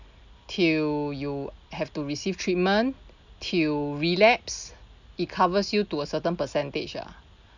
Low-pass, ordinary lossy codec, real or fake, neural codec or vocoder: 7.2 kHz; none; real; none